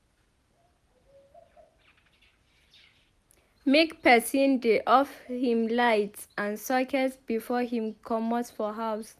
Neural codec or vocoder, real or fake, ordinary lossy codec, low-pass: none; real; none; 14.4 kHz